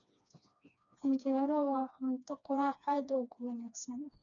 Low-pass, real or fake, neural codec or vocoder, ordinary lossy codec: 7.2 kHz; fake; codec, 16 kHz, 2 kbps, FreqCodec, smaller model; none